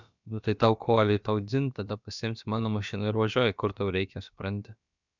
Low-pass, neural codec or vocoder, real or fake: 7.2 kHz; codec, 16 kHz, about 1 kbps, DyCAST, with the encoder's durations; fake